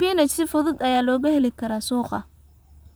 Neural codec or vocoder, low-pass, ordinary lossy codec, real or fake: vocoder, 44.1 kHz, 128 mel bands, Pupu-Vocoder; none; none; fake